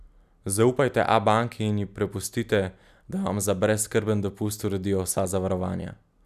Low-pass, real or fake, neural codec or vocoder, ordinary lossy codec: 14.4 kHz; real; none; none